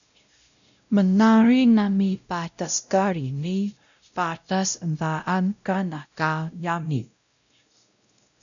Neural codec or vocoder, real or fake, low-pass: codec, 16 kHz, 0.5 kbps, X-Codec, WavLM features, trained on Multilingual LibriSpeech; fake; 7.2 kHz